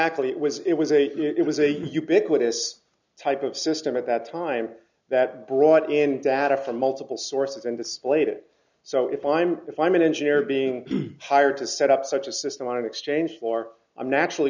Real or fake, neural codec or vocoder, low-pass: real; none; 7.2 kHz